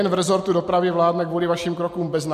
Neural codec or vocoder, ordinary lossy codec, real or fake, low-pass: none; MP3, 64 kbps; real; 14.4 kHz